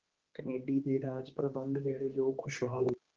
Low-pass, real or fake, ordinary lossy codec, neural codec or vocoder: 7.2 kHz; fake; Opus, 16 kbps; codec, 16 kHz, 2 kbps, X-Codec, HuBERT features, trained on general audio